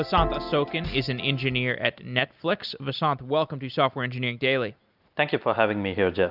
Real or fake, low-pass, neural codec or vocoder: real; 5.4 kHz; none